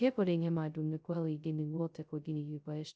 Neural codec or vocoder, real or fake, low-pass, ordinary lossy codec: codec, 16 kHz, 0.2 kbps, FocalCodec; fake; none; none